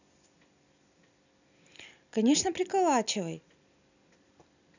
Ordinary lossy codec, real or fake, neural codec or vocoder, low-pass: none; real; none; 7.2 kHz